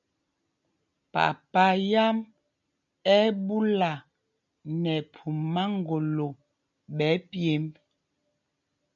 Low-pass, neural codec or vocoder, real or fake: 7.2 kHz; none; real